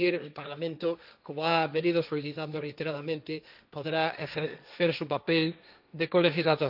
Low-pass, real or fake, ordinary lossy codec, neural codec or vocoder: 5.4 kHz; fake; none; codec, 16 kHz, 1.1 kbps, Voila-Tokenizer